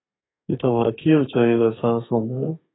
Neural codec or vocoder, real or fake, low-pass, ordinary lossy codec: codec, 32 kHz, 1.9 kbps, SNAC; fake; 7.2 kHz; AAC, 16 kbps